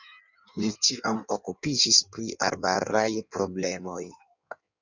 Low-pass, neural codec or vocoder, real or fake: 7.2 kHz; codec, 16 kHz in and 24 kHz out, 1.1 kbps, FireRedTTS-2 codec; fake